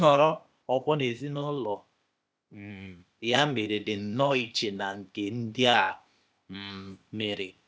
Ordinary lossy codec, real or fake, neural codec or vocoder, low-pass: none; fake; codec, 16 kHz, 0.8 kbps, ZipCodec; none